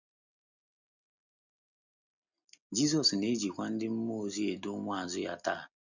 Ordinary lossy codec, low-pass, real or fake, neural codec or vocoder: none; 7.2 kHz; real; none